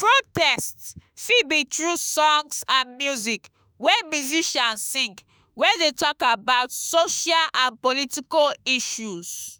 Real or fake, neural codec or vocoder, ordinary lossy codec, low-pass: fake; autoencoder, 48 kHz, 32 numbers a frame, DAC-VAE, trained on Japanese speech; none; none